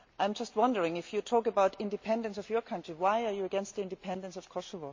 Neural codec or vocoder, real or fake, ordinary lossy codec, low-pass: none; real; MP3, 48 kbps; 7.2 kHz